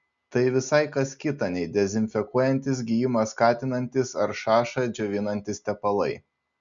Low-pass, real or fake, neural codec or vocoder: 7.2 kHz; real; none